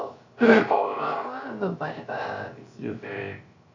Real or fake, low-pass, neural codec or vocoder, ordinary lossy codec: fake; 7.2 kHz; codec, 16 kHz, 0.3 kbps, FocalCodec; Opus, 64 kbps